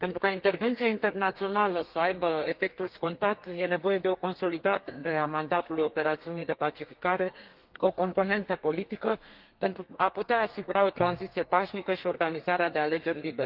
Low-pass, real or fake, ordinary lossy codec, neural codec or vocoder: 5.4 kHz; fake; Opus, 24 kbps; codec, 32 kHz, 1.9 kbps, SNAC